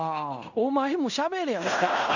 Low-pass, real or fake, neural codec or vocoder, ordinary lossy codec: 7.2 kHz; fake; codec, 16 kHz in and 24 kHz out, 0.9 kbps, LongCat-Audio-Codec, fine tuned four codebook decoder; none